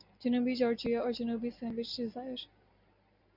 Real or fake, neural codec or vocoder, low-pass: real; none; 5.4 kHz